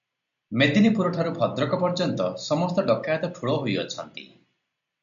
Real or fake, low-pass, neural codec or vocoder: real; 7.2 kHz; none